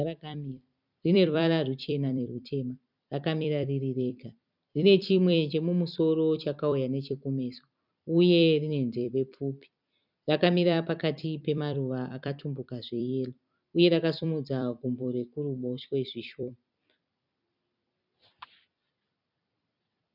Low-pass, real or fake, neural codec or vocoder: 5.4 kHz; fake; vocoder, 44.1 kHz, 128 mel bands every 256 samples, BigVGAN v2